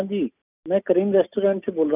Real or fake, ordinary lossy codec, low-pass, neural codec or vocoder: real; none; 3.6 kHz; none